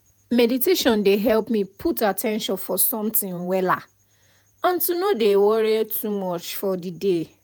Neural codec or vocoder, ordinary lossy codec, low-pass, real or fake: vocoder, 48 kHz, 128 mel bands, Vocos; none; none; fake